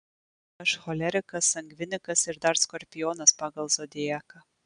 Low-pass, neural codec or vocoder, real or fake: 9.9 kHz; none; real